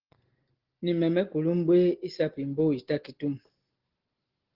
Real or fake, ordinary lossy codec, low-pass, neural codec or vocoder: real; Opus, 16 kbps; 5.4 kHz; none